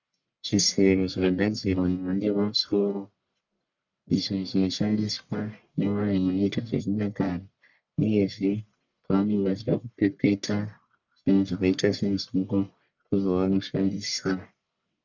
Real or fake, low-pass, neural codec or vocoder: fake; 7.2 kHz; codec, 44.1 kHz, 1.7 kbps, Pupu-Codec